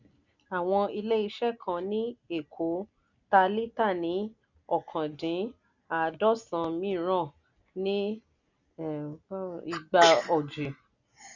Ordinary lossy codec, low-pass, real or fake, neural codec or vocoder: none; 7.2 kHz; real; none